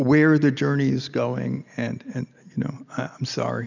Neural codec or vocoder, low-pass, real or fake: none; 7.2 kHz; real